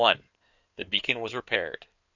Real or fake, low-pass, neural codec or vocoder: fake; 7.2 kHz; codec, 16 kHz in and 24 kHz out, 2.2 kbps, FireRedTTS-2 codec